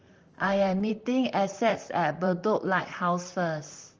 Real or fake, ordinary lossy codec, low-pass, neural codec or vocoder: fake; Opus, 24 kbps; 7.2 kHz; vocoder, 44.1 kHz, 128 mel bands, Pupu-Vocoder